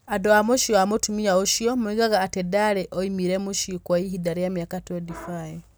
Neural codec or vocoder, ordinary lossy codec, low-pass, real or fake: none; none; none; real